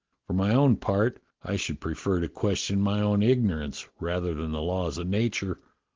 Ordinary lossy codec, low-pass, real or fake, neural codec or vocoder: Opus, 16 kbps; 7.2 kHz; real; none